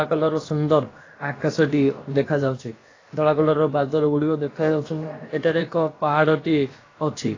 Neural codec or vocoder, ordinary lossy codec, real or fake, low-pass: codec, 16 kHz, about 1 kbps, DyCAST, with the encoder's durations; AAC, 32 kbps; fake; 7.2 kHz